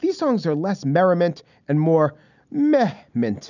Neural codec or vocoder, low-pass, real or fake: none; 7.2 kHz; real